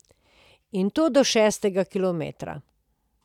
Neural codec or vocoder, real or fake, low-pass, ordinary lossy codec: none; real; 19.8 kHz; none